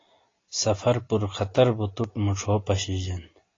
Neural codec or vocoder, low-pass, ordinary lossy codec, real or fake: none; 7.2 kHz; AAC, 32 kbps; real